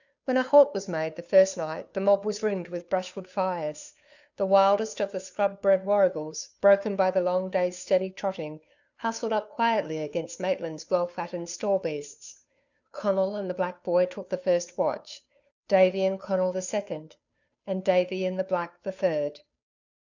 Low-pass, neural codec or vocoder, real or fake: 7.2 kHz; codec, 16 kHz, 2 kbps, FunCodec, trained on Chinese and English, 25 frames a second; fake